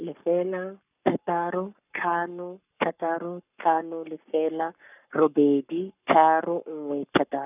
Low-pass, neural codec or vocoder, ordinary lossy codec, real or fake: 3.6 kHz; codec, 44.1 kHz, 7.8 kbps, Pupu-Codec; none; fake